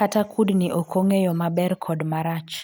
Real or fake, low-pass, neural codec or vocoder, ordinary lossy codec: real; none; none; none